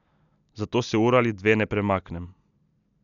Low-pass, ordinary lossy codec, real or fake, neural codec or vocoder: 7.2 kHz; none; real; none